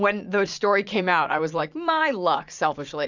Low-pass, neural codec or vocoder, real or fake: 7.2 kHz; vocoder, 44.1 kHz, 80 mel bands, Vocos; fake